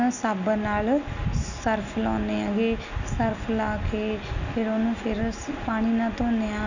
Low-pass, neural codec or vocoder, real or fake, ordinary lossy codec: 7.2 kHz; none; real; none